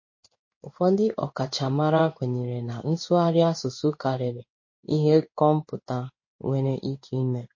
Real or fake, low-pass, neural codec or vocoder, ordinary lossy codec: fake; 7.2 kHz; codec, 16 kHz in and 24 kHz out, 1 kbps, XY-Tokenizer; MP3, 32 kbps